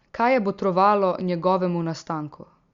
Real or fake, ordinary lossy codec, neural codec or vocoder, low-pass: real; Opus, 64 kbps; none; 7.2 kHz